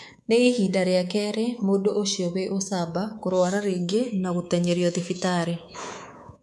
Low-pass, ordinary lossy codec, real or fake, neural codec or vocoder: none; none; fake; codec, 24 kHz, 3.1 kbps, DualCodec